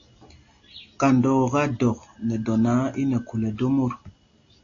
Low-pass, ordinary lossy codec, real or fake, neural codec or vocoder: 7.2 kHz; AAC, 48 kbps; real; none